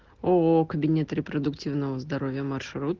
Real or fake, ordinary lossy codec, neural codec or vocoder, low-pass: real; Opus, 16 kbps; none; 7.2 kHz